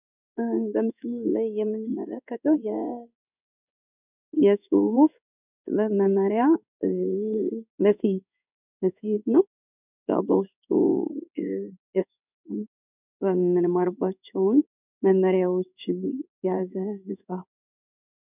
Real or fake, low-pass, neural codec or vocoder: fake; 3.6 kHz; codec, 16 kHz in and 24 kHz out, 1 kbps, XY-Tokenizer